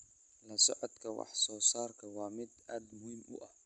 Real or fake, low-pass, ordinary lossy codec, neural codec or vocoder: real; 10.8 kHz; none; none